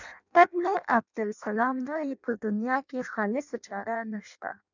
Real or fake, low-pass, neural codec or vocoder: fake; 7.2 kHz; codec, 16 kHz in and 24 kHz out, 0.6 kbps, FireRedTTS-2 codec